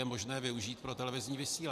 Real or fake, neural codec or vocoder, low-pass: fake; vocoder, 44.1 kHz, 128 mel bands every 512 samples, BigVGAN v2; 14.4 kHz